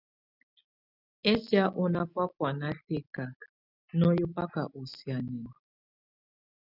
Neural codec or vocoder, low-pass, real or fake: none; 5.4 kHz; real